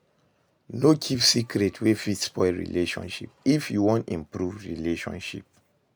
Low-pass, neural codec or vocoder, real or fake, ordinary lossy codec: none; none; real; none